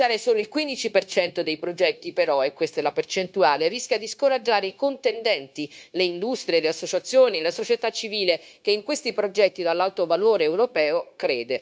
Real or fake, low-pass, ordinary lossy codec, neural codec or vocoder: fake; none; none; codec, 16 kHz, 0.9 kbps, LongCat-Audio-Codec